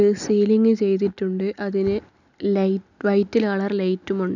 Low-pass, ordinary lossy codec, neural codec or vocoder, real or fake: 7.2 kHz; none; none; real